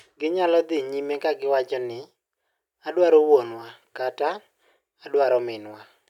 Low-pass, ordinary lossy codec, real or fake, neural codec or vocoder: 19.8 kHz; none; real; none